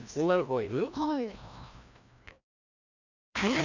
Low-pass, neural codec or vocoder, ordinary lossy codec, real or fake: 7.2 kHz; codec, 16 kHz, 1 kbps, FreqCodec, larger model; none; fake